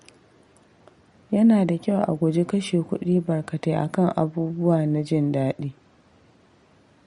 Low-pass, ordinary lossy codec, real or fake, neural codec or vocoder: 10.8 kHz; MP3, 48 kbps; real; none